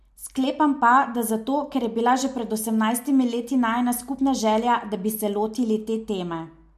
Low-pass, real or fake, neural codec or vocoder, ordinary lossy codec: 14.4 kHz; real; none; MP3, 64 kbps